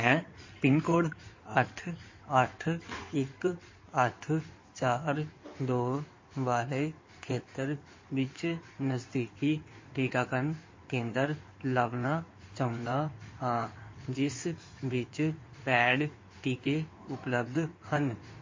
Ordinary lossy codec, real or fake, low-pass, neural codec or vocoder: MP3, 32 kbps; fake; 7.2 kHz; codec, 16 kHz in and 24 kHz out, 2.2 kbps, FireRedTTS-2 codec